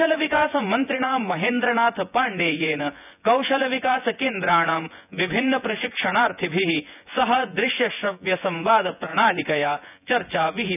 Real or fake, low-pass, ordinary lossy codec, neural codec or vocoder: fake; 3.6 kHz; none; vocoder, 24 kHz, 100 mel bands, Vocos